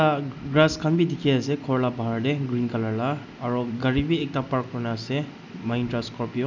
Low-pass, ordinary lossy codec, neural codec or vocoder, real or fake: 7.2 kHz; none; none; real